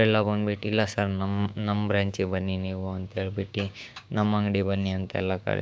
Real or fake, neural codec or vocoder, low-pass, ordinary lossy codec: fake; codec, 16 kHz, 6 kbps, DAC; none; none